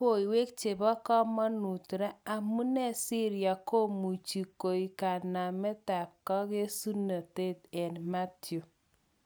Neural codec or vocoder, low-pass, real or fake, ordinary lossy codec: none; none; real; none